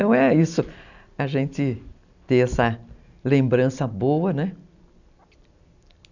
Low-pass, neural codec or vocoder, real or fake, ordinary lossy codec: 7.2 kHz; none; real; none